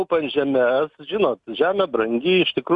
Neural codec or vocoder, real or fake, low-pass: none; real; 10.8 kHz